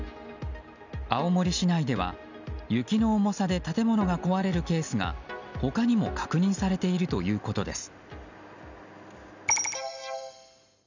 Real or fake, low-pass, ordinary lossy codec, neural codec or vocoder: real; 7.2 kHz; none; none